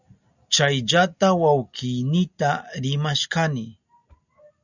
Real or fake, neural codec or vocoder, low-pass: real; none; 7.2 kHz